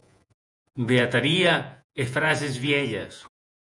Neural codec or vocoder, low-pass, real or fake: vocoder, 48 kHz, 128 mel bands, Vocos; 10.8 kHz; fake